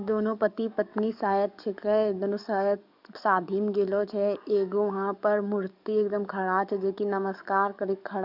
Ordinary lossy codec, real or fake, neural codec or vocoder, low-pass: none; fake; codec, 44.1 kHz, 7.8 kbps, DAC; 5.4 kHz